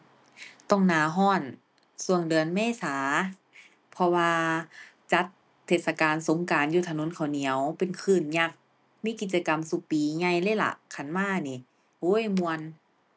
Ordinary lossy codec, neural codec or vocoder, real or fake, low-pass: none; none; real; none